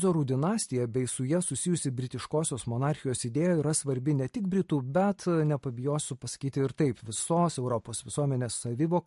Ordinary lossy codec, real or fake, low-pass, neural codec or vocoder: MP3, 48 kbps; real; 14.4 kHz; none